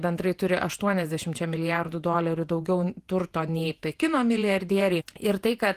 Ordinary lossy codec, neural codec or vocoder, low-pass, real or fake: Opus, 24 kbps; vocoder, 48 kHz, 128 mel bands, Vocos; 14.4 kHz; fake